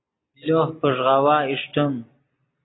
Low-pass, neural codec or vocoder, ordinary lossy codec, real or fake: 7.2 kHz; none; AAC, 16 kbps; real